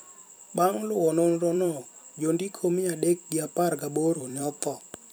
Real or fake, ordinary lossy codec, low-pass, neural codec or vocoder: fake; none; none; vocoder, 44.1 kHz, 128 mel bands every 256 samples, BigVGAN v2